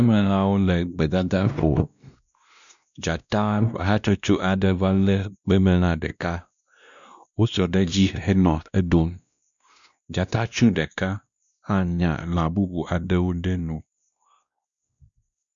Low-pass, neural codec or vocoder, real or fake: 7.2 kHz; codec, 16 kHz, 1 kbps, X-Codec, WavLM features, trained on Multilingual LibriSpeech; fake